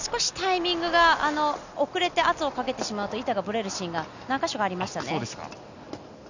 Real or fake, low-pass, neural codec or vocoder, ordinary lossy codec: real; 7.2 kHz; none; none